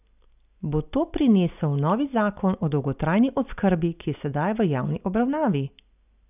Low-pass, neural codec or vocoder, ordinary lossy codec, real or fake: 3.6 kHz; none; none; real